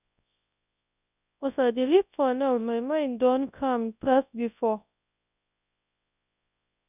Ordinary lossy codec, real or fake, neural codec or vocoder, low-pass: none; fake; codec, 24 kHz, 0.9 kbps, WavTokenizer, large speech release; 3.6 kHz